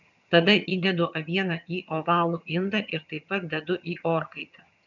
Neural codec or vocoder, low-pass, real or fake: vocoder, 22.05 kHz, 80 mel bands, HiFi-GAN; 7.2 kHz; fake